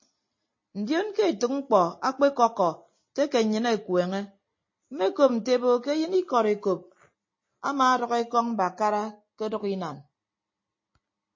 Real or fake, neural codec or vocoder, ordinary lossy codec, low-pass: real; none; MP3, 32 kbps; 7.2 kHz